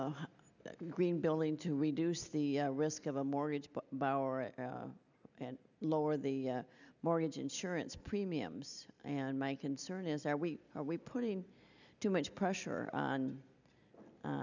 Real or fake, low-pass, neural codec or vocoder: real; 7.2 kHz; none